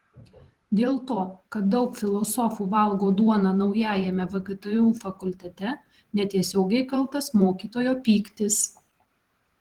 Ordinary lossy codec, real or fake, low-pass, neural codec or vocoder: Opus, 16 kbps; fake; 19.8 kHz; vocoder, 44.1 kHz, 128 mel bands every 512 samples, BigVGAN v2